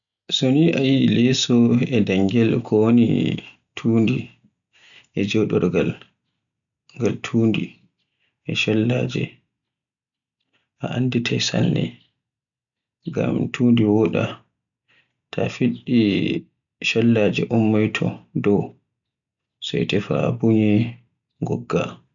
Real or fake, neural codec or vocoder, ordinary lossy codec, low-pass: real; none; none; 7.2 kHz